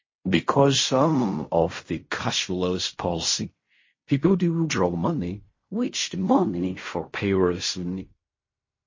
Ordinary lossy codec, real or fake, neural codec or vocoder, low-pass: MP3, 32 kbps; fake; codec, 16 kHz in and 24 kHz out, 0.4 kbps, LongCat-Audio-Codec, fine tuned four codebook decoder; 7.2 kHz